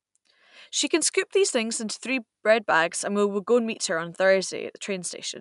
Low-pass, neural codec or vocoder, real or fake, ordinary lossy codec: 10.8 kHz; none; real; MP3, 96 kbps